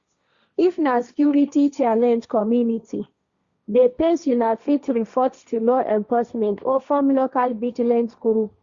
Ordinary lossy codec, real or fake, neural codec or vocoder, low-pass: Opus, 64 kbps; fake; codec, 16 kHz, 1.1 kbps, Voila-Tokenizer; 7.2 kHz